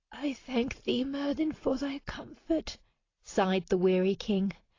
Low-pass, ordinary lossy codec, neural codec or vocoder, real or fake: 7.2 kHz; AAC, 32 kbps; none; real